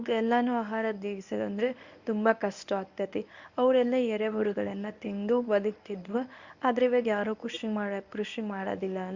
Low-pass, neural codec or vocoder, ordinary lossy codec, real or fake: 7.2 kHz; codec, 24 kHz, 0.9 kbps, WavTokenizer, medium speech release version 1; Opus, 64 kbps; fake